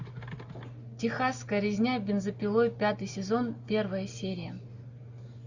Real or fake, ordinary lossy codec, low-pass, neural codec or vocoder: real; Opus, 64 kbps; 7.2 kHz; none